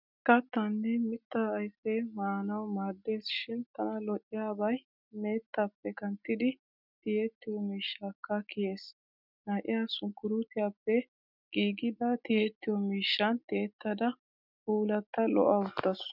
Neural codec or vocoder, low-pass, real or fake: none; 5.4 kHz; real